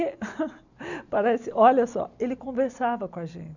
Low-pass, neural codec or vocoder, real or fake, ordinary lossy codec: 7.2 kHz; none; real; none